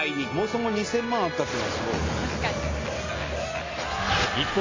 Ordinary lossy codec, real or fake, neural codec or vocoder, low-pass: AAC, 32 kbps; real; none; 7.2 kHz